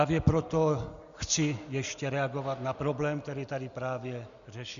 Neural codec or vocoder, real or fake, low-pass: none; real; 7.2 kHz